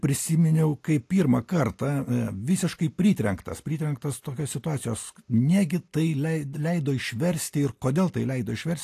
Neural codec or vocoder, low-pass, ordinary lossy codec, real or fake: none; 14.4 kHz; AAC, 64 kbps; real